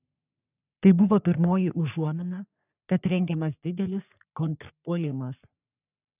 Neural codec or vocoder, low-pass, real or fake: codec, 44.1 kHz, 3.4 kbps, Pupu-Codec; 3.6 kHz; fake